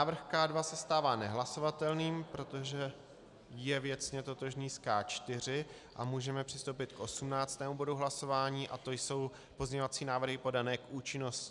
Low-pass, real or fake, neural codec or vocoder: 10.8 kHz; real; none